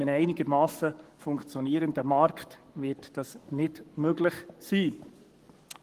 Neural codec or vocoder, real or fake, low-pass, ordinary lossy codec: codec, 44.1 kHz, 7.8 kbps, Pupu-Codec; fake; 14.4 kHz; Opus, 24 kbps